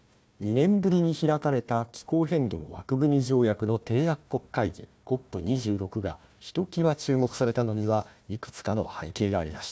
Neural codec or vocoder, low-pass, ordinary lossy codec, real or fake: codec, 16 kHz, 1 kbps, FunCodec, trained on Chinese and English, 50 frames a second; none; none; fake